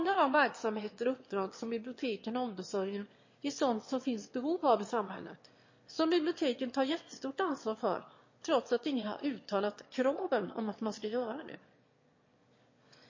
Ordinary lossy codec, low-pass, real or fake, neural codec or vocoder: MP3, 32 kbps; 7.2 kHz; fake; autoencoder, 22.05 kHz, a latent of 192 numbers a frame, VITS, trained on one speaker